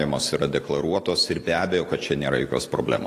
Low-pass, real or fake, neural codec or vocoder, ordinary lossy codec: 14.4 kHz; real; none; AAC, 48 kbps